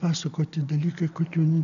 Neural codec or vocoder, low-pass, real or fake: none; 7.2 kHz; real